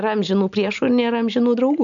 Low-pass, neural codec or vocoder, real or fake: 7.2 kHz; none; real